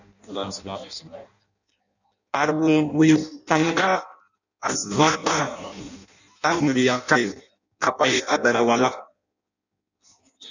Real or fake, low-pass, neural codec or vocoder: fake; 7.2 kHz; codec, 16 kHz in and 24 kHz out, 0.6 kbps, FireRedTTS-2 codec